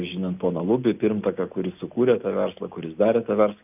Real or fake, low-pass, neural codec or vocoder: real; 3.6 kHz; none